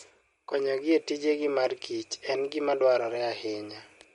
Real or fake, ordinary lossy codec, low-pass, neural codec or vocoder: real; MP3, 48 kbps; 19.8 kHz; none